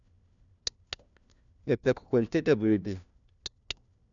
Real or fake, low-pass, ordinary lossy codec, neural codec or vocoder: fake; 7.2 kHz; none; codec, 16 kHz, 1 kbps, FunCodec, trained on LibriTTS, 50 frames a second